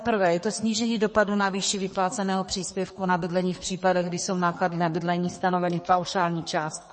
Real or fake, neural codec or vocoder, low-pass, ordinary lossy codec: fake; codec, 32 kHz, 1.9 kbps, SNAC; 10.8 kHz; MP3, 32 kbps